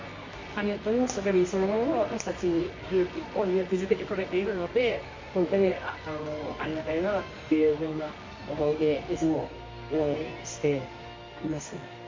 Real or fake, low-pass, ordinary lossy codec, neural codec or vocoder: fake; 7.2 kHz; MP3, 32 kbps; codec, 24 kHz, 0.9 kbps, WavTokenizer, medium music audio release